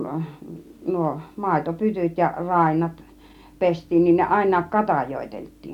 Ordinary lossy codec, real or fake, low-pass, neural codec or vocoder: none; real; 19.8 kHz; none